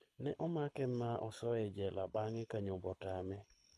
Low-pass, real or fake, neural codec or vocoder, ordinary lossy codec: none; fake; codec, 24 kHz, 6 kbps, HILCodec; none